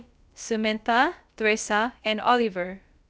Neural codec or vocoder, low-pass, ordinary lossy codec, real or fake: codec, 16 kHz, about 1 kbps, DyCAST, with the encoder's durations; none; none; fake